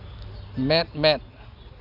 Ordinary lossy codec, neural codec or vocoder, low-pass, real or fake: none; none; 5.4 kHz; real